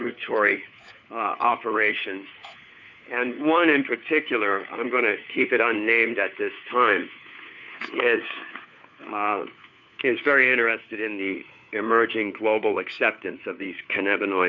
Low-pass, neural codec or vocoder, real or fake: 7.2 kHz; codec, 16 kHz, 4 kbps, FunCodec, trained on LibriTTS, 50 frames a second; fake